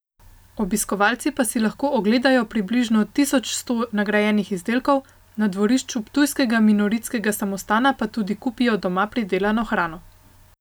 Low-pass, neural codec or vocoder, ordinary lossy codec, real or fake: none; none; none; real